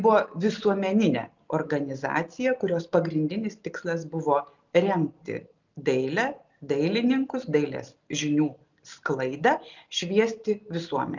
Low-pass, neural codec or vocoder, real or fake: 7.2 kHz; none; real